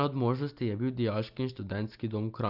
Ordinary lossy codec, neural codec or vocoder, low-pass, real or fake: Opus, 24 kbps; none; 5.4 kHz; real